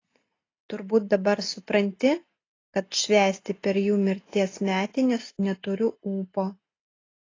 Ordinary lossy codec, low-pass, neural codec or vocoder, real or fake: AAC, 32 kbps; 7.2 kHz; none; real